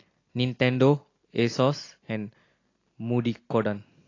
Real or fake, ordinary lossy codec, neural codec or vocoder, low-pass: real; AAC, 32 kbps; none; 7.2 kHz